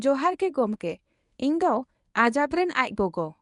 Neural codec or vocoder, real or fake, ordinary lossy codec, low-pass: codec, 24 kHz, 0.9 kbps, WavTokenizer, medium speech release version 1; fake; none; 10.8 kHz